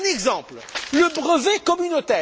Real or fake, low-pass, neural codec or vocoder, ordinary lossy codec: real; none; none; none